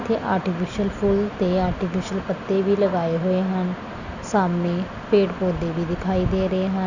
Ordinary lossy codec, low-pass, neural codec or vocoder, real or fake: none; 7.2 kHz; none; real